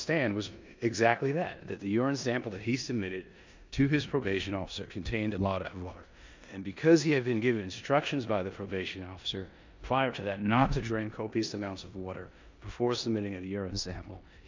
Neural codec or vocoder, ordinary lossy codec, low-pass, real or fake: codec, 16 kHz in and 24 kHz out, 0.9 kbps, LongCat-Audio-Codec, four codebook decoder; MP3, 64 kbps; 7.2 kHz; fake